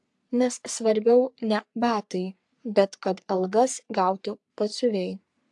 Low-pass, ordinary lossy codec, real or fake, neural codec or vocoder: 10.8 kHz; AAC, 64 kbps; fake; codec, 44.1 kHz, 3.4 kbps, Pupu-Codec